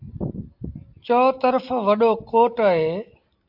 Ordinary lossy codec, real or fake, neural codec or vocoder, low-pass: AAC, 48 kbps; real; none; 5.4 kHz